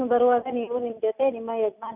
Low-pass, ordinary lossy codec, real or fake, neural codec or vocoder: 3.6 kHz; none; real; none